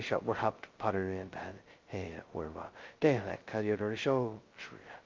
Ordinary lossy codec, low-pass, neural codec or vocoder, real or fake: Opus, 32 kbps; 7.2 kHz; codec, 16 kHz, 0.2 kbps, FocalCodec; fake